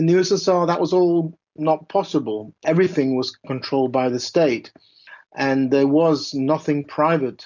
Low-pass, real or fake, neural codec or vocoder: 7.2 kHz; real; none